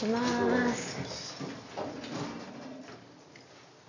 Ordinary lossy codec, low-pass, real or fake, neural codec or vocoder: none; 7.2 kHz; real; none